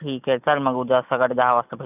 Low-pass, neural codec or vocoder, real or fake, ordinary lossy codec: 3.6 kHz; none; real; none